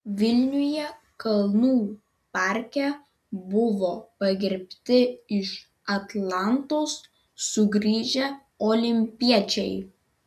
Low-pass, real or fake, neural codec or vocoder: 14.4 kHz; real; none